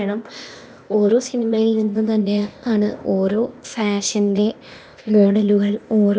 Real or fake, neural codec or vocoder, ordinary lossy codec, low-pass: fake; codec, 16 kHz, 0.8 kbps, ZipCodec; none; none